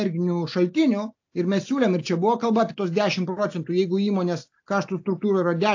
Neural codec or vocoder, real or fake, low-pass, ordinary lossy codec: none; real; 7.2 kHz; AAC, 48 kbps